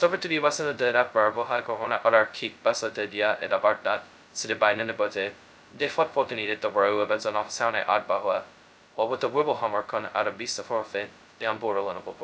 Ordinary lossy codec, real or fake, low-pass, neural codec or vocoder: none; fake; none; codec, 16 kHz, 0.2 kbps, FocalCodec